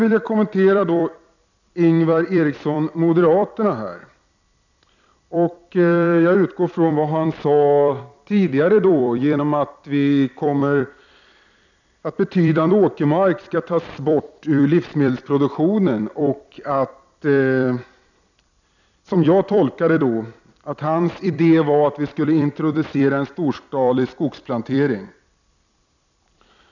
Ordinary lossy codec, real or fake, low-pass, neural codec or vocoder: none; fake; 7.2 kHz; vocoder, 44.1 kHz, 128 mel bands every 256 samples, BigVGAN v2